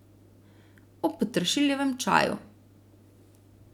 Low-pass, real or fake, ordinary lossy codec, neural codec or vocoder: 19.8 kHz; real; none; none